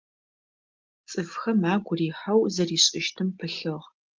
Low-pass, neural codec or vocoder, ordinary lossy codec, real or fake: 7.2 kHz; none; Opus, 32 kbps; real